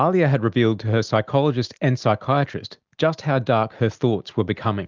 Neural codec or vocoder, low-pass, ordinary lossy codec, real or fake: none; 7.2 kHz; Opus, 32 kbps; real